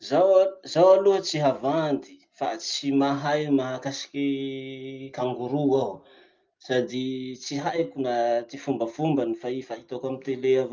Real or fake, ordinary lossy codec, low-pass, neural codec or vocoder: real; Opus, 32 kbps; 7.2 kHz; none